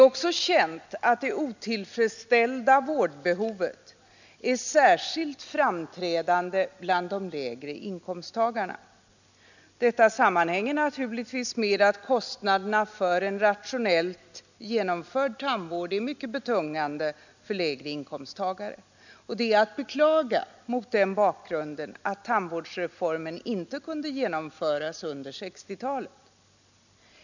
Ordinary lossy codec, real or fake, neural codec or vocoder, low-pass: none; real; none; 7.2 kHz